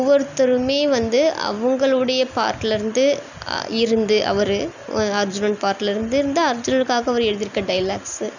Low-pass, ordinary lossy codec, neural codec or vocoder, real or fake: 7.2 kHz; none; none; real